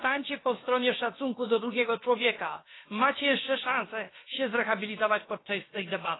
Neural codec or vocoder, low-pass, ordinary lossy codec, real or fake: codec, 16 kHz, about 1 kbps, DyCAST, with the encoder's durations; 7.2 kHz; AAC, 16 kbps; fake